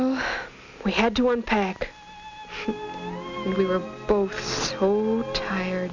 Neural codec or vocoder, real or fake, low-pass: none; real; 7.2 kHz